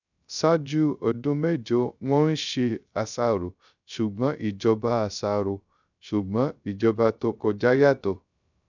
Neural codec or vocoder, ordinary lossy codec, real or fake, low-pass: codec, 16 kHz, 0.3 kbps, FocalCodec; none; fake; 7.2 kHz